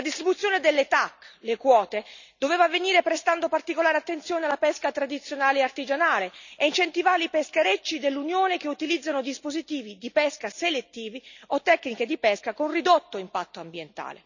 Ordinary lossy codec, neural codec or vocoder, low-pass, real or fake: none; none; 7.2 kHz; real